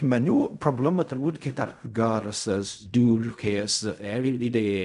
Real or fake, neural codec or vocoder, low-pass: fake; codec, 16 kHz in and 24 kHz out, 0.4 kbps, LongCat-Audio-Codec, fine tuned four codebook decoder; 10.8 kHz